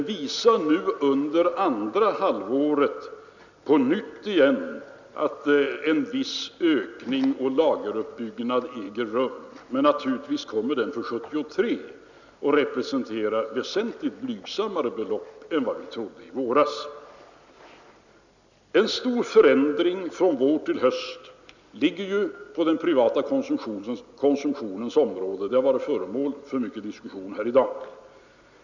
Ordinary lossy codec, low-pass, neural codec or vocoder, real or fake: none; 7.2 kHz; none; real